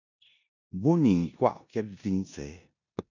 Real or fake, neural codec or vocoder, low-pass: fake; codec, 16 kHz in and 24 kHz out, 0.9 kbps, LongCat-Audio-Codec, four codebook decoder; 7.2 kHz